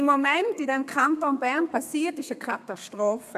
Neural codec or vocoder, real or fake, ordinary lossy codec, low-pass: codec, 32 kHz, 1.9 kbps, SNAC; fake; AAC, 96 kbps; 14.4 kHz